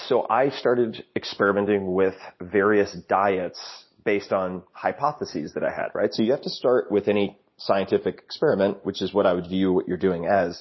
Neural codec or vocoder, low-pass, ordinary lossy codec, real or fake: none; 7.2 kHz; MP3, 24 kbps; real